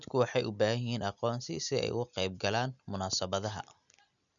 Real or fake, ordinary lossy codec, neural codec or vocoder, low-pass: real; none; none; 7.2 kHz